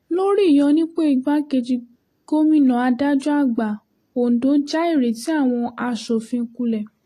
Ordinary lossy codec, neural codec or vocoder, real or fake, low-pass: AAC, 48 kbps; none; real; 14.4 kHz